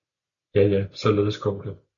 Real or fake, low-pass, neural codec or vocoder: real; 7.2 kHz; none